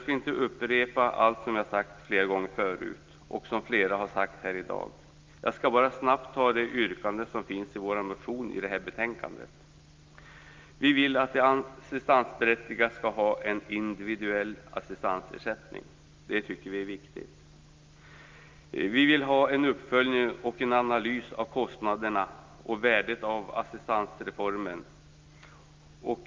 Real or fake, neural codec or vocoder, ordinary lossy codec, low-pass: real; none; Opus, 24 kbps; 7.2 kHz